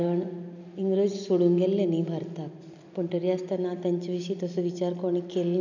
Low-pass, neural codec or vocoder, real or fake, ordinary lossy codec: 7.2 kHz; none; real; none